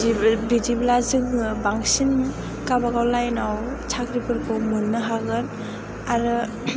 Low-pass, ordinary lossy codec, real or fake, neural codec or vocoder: none; none; real; none